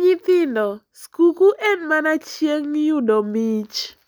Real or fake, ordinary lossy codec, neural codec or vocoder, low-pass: real; none; none; none